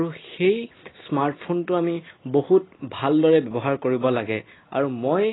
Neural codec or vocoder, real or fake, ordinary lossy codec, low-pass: none; real; AAC, 16 kbps; 7.2 kHz